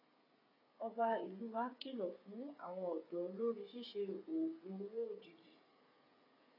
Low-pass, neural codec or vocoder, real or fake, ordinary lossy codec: 5.4 kHz; codec, 16 kHz, 8 kbps, FreqCodec, smaller model; fake; AAC, 24 kbps